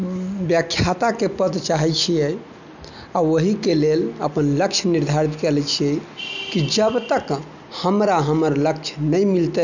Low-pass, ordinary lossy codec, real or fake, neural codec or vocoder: 7.2 kHz; none; real; none